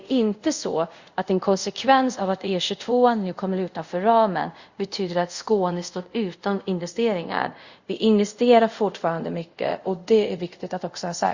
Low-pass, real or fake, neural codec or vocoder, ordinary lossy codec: 7.2 kHz; fake; codec, 24 kHz, 0.5 kbps, DualCodec; Opus, 64 kbps